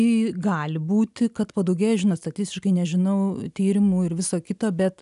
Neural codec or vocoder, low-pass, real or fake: none; 10.8 kHz; real